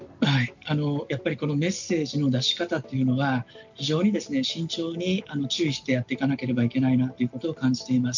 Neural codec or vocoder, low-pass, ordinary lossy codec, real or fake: none; 7.2 kHz; MP3, 64 kbps; real